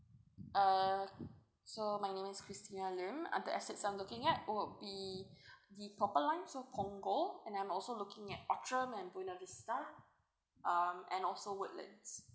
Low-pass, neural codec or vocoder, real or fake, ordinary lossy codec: none; none; real; none